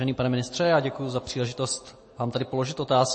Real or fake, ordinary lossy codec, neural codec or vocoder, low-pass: real; MP3, 32 kbps; none; 9.9 kHz